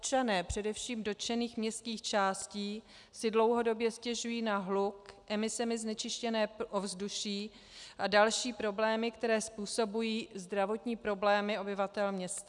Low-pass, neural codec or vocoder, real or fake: 10.8 kHz; none; real